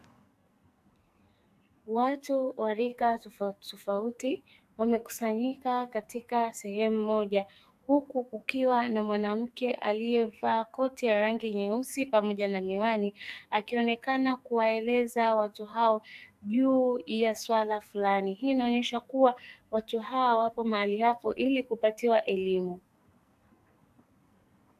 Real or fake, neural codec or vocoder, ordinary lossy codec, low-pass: fake; codec, 44.1 kHz, 2.6 kbps, SNAC; AAC, 96 kbps; 14.4 kHz